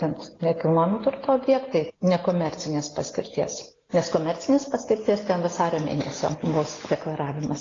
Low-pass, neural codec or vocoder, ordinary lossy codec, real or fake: 7.2 kHz; none; AAC, 32 kbps; real